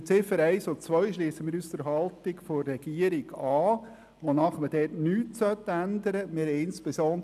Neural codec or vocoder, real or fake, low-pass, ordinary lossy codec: none; real; 14.4 kHz; none